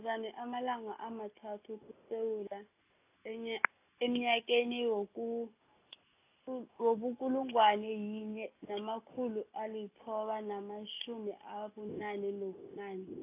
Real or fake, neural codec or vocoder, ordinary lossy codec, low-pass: real; none; AAC, 24 kbps; 3.6 kHz